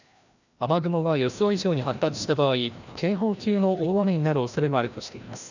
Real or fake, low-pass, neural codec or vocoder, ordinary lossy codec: fake; 7.2 kHz; codec, 16 kHz, 1 kbps, FreqCodec, larger model; none